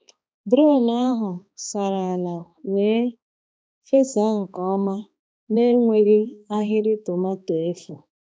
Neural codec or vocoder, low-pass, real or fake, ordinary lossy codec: codec, 16 kHz, 2 kbps, X-Codec, HuBERT features, trained on balanced general audio; none; fake; none